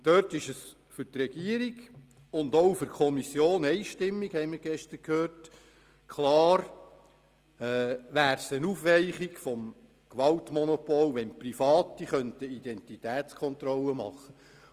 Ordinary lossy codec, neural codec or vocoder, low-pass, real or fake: Opus, 32 kbps; none; 14.4 kHz; real